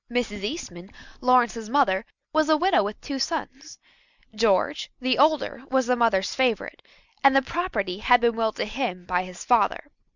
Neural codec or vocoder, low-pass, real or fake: none; 7.2 kHz; real